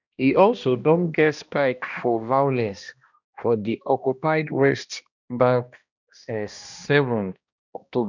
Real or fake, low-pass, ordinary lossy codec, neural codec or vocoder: fake; 7.2 kHz; none; codec, 16 kHz, 1 kbps, X-Codec, HuBERT features, trained on balanced general audio